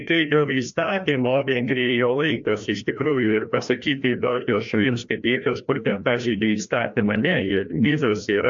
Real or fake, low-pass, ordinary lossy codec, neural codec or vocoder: fake; 7.2 kHz; AAC, 64 kbps; codec, 16 kHz, 1 kbps, FreqCodec, larger model